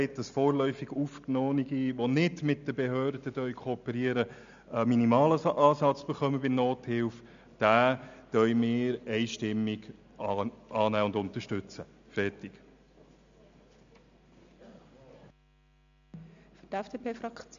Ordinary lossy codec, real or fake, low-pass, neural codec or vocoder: MP3, 64 kbps; real; 7.2 kHz; none